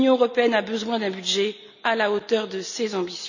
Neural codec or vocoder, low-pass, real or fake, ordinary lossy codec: none; 7.2 kHz; real; none